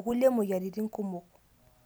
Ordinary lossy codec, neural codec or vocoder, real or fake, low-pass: none; none; real; none